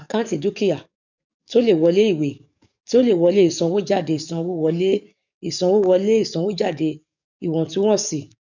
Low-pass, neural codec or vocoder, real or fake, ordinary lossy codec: 7.2 kHz; codec, 44.1 kHz, 7.8 kbps, DAC; fake; none